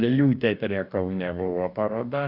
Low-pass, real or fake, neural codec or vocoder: 5.4 kHz; fake; codec, 44.1 kHz, 2.6 kbps, DAC